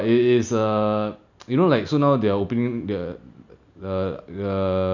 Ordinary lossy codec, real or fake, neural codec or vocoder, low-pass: none; real; none; 7.2 kHz